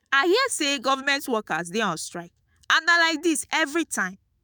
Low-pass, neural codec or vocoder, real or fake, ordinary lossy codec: none; autoencoder, 48 kHz, 128 numbers a frame, DAC-VAE, trained on Japanese speech; fake; none